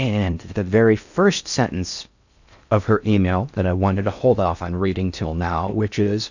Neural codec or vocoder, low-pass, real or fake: codec, 16 kHz in and 24 kHz out, 0.6 kbps, FocalCodec, streaming, 4096 codes; 7.2 kHz; fake